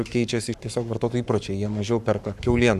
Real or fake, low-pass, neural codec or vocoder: fake; 14.4 kHz; codec, 44.1 kHz, 7.8 kbps, Pupu-Codec